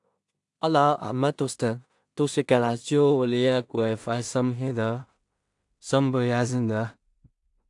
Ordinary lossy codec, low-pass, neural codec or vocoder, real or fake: AAC, 64 kbps; 10.8 kHz; codec, 16 kHz in and 24 kHz out, 0.4 kbps, LongCat-Audio-Codec, two codebook decoder; fake